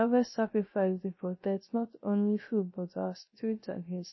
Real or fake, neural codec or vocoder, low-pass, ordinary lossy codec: fake; codec, 16 kHz, 0.3 kbps, FocalCodec; 7.2 kHz; MP3, 24 kbps